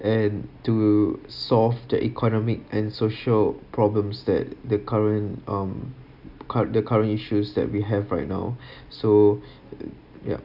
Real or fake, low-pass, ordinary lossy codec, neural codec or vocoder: real; 5.4 kHz; none; none